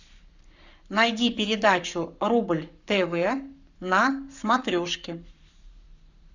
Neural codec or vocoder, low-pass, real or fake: codec, 44.1 kHz, 7.8 kbps, Pupu-Codec; 7.2 kHz; fake